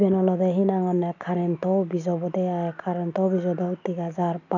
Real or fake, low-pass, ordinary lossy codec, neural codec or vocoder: real; 7.2 kHz; none; none